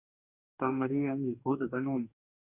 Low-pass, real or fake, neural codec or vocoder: 3.6 kHz; fake; codec, 44.1 kHz, 2.6 kbps, DAC